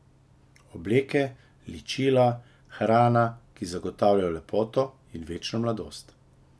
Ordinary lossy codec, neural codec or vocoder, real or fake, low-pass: none; none; real; none